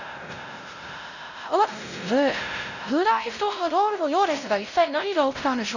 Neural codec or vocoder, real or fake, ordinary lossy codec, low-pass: codec, 16 kHz, 0.5 kbps, X-Codec, WavLM features, trained on Multilingual LibriSpeech; fake; none; 7.2 kHz